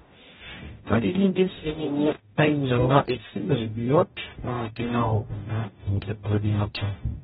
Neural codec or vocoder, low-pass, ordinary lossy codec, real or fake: codec, 44.1 kHz, 0.9 kbps, DAC; 19.8 kHz; AAC, 16 kbps; fake